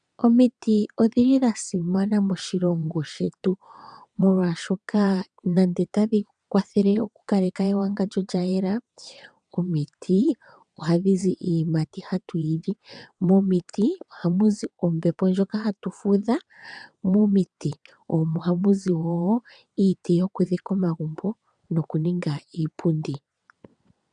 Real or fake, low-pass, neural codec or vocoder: fake; 9.9 kHz; vocoder, 22.05 kHz, 80 mel bands, WaveNeXt